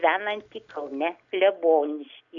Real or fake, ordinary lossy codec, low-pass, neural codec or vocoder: real; AAC, 64 kbps; 7.2 kHz; none